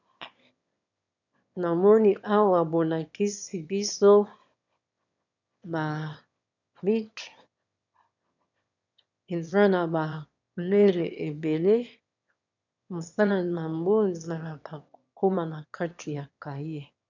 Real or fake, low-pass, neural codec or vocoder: fake; 7.2 kHz; autoencoder, 22.05 kHz, a latent of 192 numbers a frame, VITS, trained on one speaker